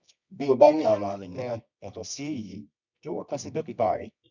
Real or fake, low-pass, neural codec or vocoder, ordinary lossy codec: fake; 7.2 kHz; codec, 24 kHz, 0.9 kbps, WavTokenizer, medium music audio release; none